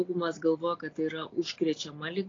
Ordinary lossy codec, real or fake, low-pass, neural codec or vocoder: AAC, 32 kbps; real; 7.2 kHz; none